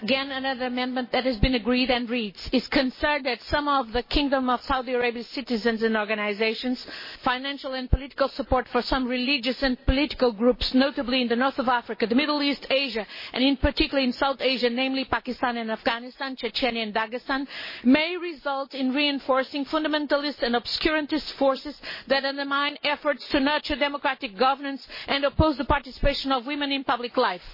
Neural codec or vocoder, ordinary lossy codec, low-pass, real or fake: none; MP3, 24 kbps; 5.4 kHz; real